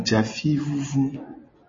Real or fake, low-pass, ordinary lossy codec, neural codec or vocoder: real; 7.2 kHz; MP3, 32 kbps; none